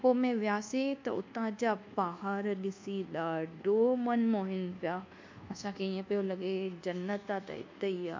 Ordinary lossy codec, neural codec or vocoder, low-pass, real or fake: MP3, 64 kbps; codec, 24 kHz, 1.2 kbps, DualCodec; 7.2 kHz; fake